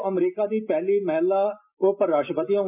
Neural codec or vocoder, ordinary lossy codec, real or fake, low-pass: none; MP3, 32 kbps; real; 3.6 kHz